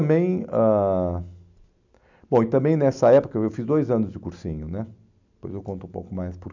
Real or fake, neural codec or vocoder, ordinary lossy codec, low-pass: real; none; none; 7.2 kHz